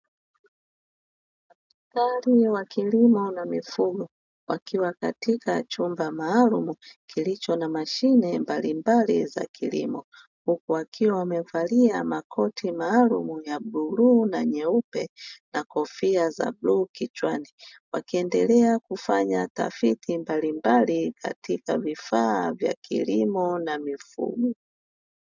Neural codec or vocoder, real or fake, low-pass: none; real; 7.2 kHz